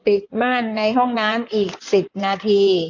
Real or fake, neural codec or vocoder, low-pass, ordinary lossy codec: fake; codec, 16 kHz in and 24 kHz out, 2.2 kbps, FireRedTTS-2 codec; 7.2 kHz; AAC, 48 kbps